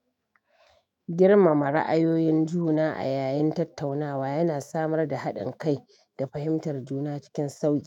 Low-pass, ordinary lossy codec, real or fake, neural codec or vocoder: 19.8 kHz; none; fake; autoencoder, 48 kHz, 128 numbers a frame, DAC-VAE, trained on Japanese speech